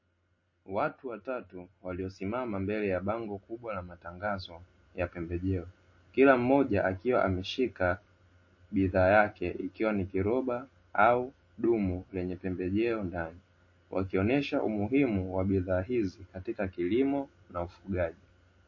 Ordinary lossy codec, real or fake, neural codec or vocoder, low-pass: MP3, 32 kbps; real; none; 7.2 kHz